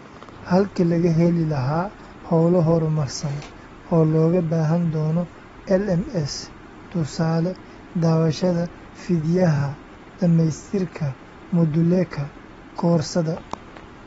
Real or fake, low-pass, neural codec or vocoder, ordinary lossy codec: real; 10.8 kHz; none; AAC, 24 kbps